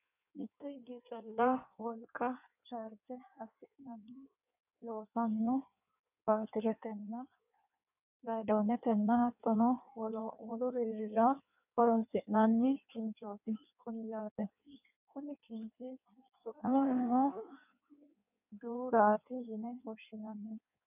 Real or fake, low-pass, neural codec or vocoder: fake; 3.6 kHz; codec, 16 kHz in and 24 kHz out, 1.1 kbps, FireRedTTS-2 codec